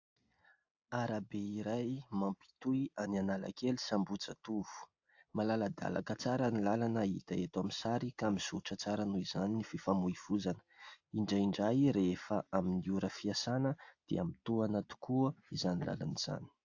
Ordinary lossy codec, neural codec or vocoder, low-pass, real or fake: AAC, 48 kbps; none; 7.2 kHz; real